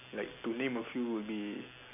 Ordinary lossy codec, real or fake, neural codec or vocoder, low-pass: AAC, 32 kbps; real; none; 3.6 kHz